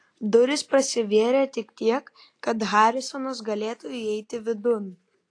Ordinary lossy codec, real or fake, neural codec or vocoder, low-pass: AAC, 48 kbps; real; none; 9.9 kHz